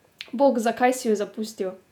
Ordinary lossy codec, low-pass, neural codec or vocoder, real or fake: none; 19.8 kHz; vocoder, 48 kHz, 128 mel bands, Vocos; fake